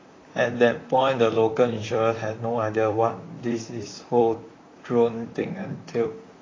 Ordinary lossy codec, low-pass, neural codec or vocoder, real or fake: AAC, 32 kbps; 7.2 kHz; vocoder, 44.1 kHz, 128 mel bands, Pupu-Vocoder; fake